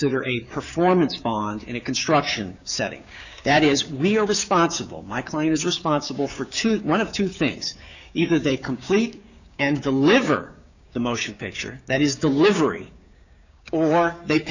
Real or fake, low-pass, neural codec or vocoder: fake; 7.2 kHz; codec, 16 kHz, 6 kbps, DAC